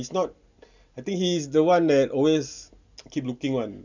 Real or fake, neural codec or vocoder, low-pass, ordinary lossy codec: real; none; 7.2 kHz; none